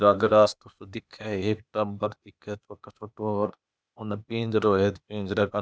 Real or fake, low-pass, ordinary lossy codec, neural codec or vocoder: fake; none; none; codec, 16 kHz, 0.8 kbps, ZipCodec